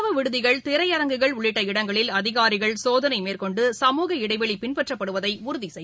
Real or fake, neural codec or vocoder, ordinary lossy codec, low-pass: real; none; none; none